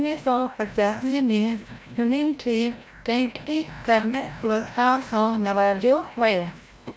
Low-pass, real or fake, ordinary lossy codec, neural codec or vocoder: none; fake; none; codec, 16 kHz, 0.5 kbps, FreqCodec, larger model